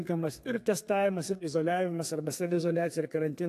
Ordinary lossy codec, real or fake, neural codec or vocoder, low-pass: AAC, 64 kbps; fake; codec, 32 kHz, 1.9 kbps, SNAC; 14.4 kHz